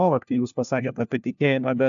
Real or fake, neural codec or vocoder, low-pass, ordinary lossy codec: fake; codec, 16 kHz, 1 kbps, FunCodec, trained on LibriTTS, 50 frames a second; 7.2 kHz; AAC, 64 kbps